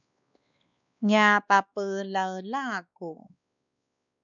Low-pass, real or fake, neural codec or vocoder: 7.2 kHz; fake; codec, 16 kHz, 2 kbps, X-Codec, WavLM features, trained on Multilingual LibriSpeech